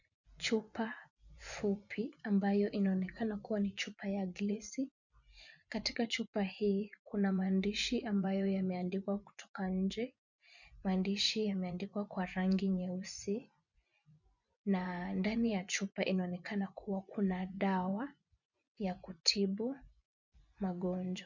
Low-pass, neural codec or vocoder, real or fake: 7.2 kHz; vocoder, 24 kHz, 100 mel bands, Vocos; fake